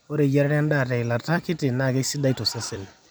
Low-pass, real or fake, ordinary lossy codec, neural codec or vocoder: none; fake; none; vocoder, 44.1 kHz, 128 mel bands every 256 samples, BigVGAN v2